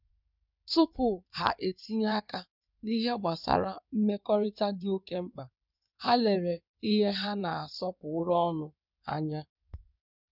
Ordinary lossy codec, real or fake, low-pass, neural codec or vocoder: none; fake; 5.4 kHz; codec, 16 kHz in and 24 kHz out, 2.2 kbps, FireRedTTS-2 codec